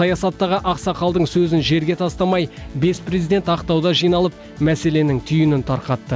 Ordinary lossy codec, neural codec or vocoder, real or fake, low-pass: none; none; real; none